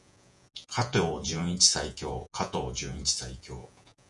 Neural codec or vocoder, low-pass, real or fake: vocoder, 48 kHz, 128 mel bands, Vocos; 10.8 kHz; fake